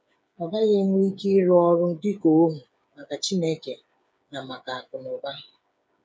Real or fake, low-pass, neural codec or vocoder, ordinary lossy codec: fake; none; codec, 16 kHz, 8 kbps, FreqCodec, smaller model; none